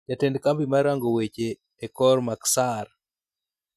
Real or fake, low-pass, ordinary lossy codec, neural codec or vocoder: real; 14.4 kHz; none; none